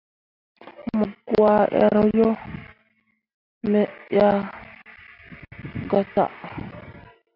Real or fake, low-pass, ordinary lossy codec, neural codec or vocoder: real; 5.4 kHz; AAC, 48 kbps; none